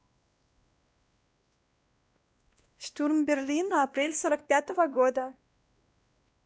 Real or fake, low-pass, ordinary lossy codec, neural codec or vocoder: fake; none; none; codec, 16 kHz, 1 kbps, X-Codec, WavLM features, trained on Multilingual LibriSpeech